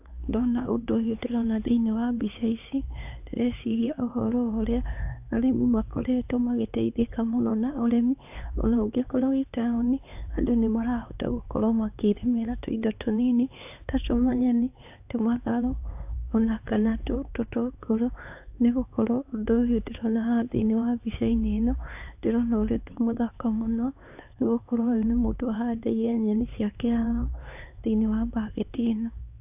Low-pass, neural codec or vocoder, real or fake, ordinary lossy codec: 3.6 kHz; codec, 16 kHz, 4 kbps, X-Codec, HuBERT features, trained on LibriSpeech; fake; AAC, 32 kbps